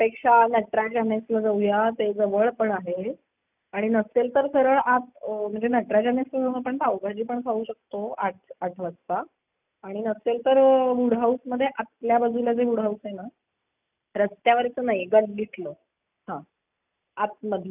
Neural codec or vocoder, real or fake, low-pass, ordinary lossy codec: none; real; 3.6 kHz; none